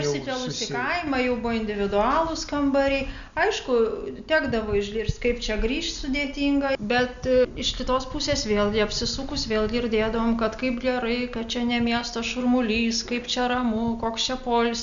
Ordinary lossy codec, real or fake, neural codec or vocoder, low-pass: MP3, 96 kbps; real; none; 7.2 kHz